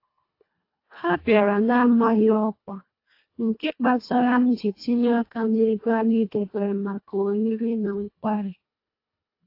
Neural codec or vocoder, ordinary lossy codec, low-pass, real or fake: codec, 24 kHz, 1.5 kbps, HILCodec; AAC, 32 kbps; 5.4 kHz; fake